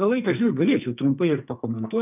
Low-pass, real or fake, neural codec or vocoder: 3.6 kHz; fake; codec, 44.1 kHz, 2.6 kbps, SNAC